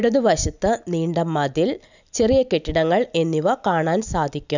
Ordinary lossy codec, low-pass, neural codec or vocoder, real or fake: none; 7.2 kHz; none; real